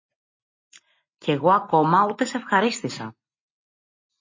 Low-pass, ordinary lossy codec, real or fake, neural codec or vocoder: 7.2 kHz; MP3, 32 kbps; real; none